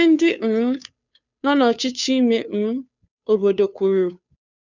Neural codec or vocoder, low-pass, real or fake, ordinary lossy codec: codec, 16 kHz, 2 kbps, FunCodec, trained on Chinese and English, 25 frames a second; 7.2 kHz; fake; none